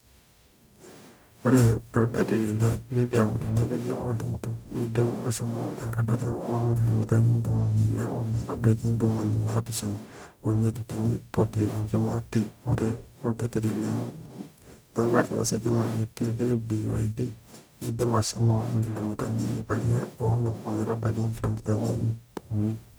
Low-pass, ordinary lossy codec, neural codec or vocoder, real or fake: none; none; codec, 44.1 kHz, 0.9 kbps, DAC; fake